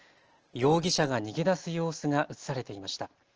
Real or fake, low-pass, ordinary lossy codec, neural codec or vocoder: real; 7.2 kHz; Opus, 16 kbps; none